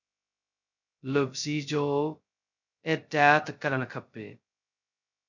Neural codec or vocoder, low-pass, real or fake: codec, 16 kHz, 0.2 kbps, FocalCodec; 7.2 kHz; fake